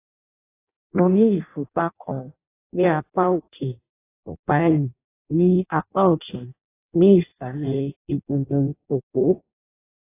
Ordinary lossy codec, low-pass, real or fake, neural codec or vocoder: AAC, 24 kbps; 3.6 kHz; fake; codec, 16 kHz in and 24 kHz out, 0.6 kbps, FireRedTTS-2 codec